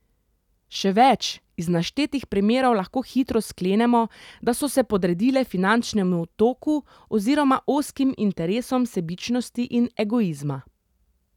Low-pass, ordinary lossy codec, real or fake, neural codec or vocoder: 19.8 kHz; none; real; none